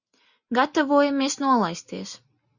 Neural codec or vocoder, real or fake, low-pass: none; real; 7.2 kHz